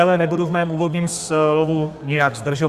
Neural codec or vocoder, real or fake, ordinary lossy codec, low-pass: codec, 32 kHz, 1.9 kbps, SNAC; fake; Opus, 64 kbps; 14.4 kHz